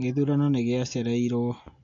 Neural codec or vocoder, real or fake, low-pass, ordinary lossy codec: none; real; 7.2 kHz; MP3, 48 kbps